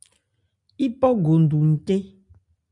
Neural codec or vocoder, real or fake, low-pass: none; real; 10.8 kHz